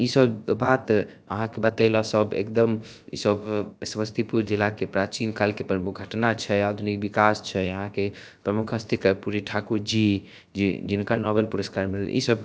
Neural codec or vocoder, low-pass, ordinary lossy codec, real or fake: codec, 16 kHz, about 1 kbps, DyCAST, with the encoder's durations; none; none; fake